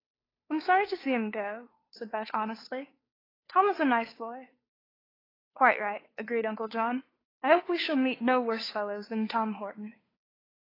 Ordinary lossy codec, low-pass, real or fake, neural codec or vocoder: AAC, 24 kbps; 5.4 kHz; fake; codec, 16 kHz, 2 kbps, FunCodec, trained on Chinese and English, 25 frames a second